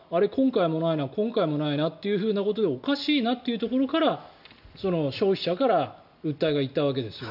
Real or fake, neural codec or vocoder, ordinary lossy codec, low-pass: real; none; none; 5.4 kHz